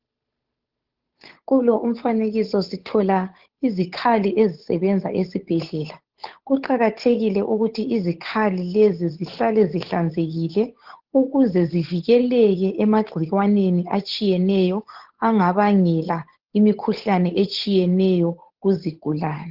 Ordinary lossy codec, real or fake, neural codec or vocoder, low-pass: Opus, 16 kbps; fake; codec, 16 kHz, 8 kbps, FunCodec, trained on Chinese and English, 25 frames a second; 5.4 kHz